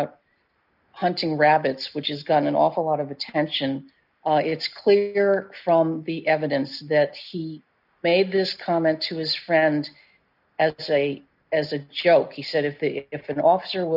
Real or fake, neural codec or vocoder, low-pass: real; none; 5.4 kHz